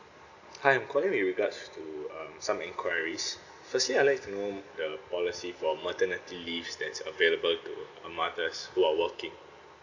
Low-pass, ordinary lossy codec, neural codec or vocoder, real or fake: 7.2 kHz; none; none; real